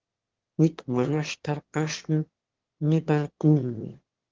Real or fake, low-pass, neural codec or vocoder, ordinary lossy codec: fake; 7.2 kHz; autoencoder, 22.05 kHz, a latent of 192 numbers a frame, VITS, trained on one speaker; Opus, 16 kbps